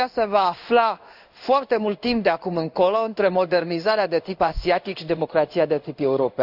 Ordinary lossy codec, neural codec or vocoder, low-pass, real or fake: none; codec, 16 kHz in and 24 kHz out, 1 kbps, XY-Tokenizer; 5.4 kHz; fake